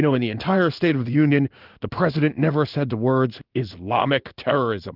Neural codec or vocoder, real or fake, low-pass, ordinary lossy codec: codec, 16 kHz in and 24 kHz out, 1 kbps, XY-Tokenizer; fake; 5.4 kHz; Opus, 32 kbps